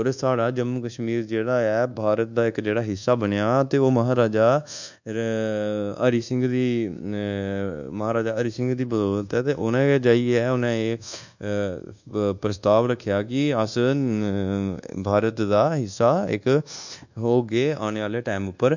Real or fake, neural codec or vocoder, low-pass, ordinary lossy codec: fake; codec, 24 kHz, 1.2 kbps, DualCodec; 7.2 kHz; none